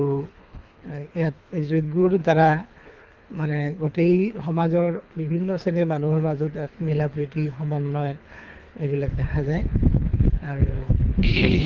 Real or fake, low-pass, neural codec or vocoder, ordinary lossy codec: fake; 7.2 kHz; codec, 24 kHz, 3 kbps, HILCodec; Opus, 24 kbps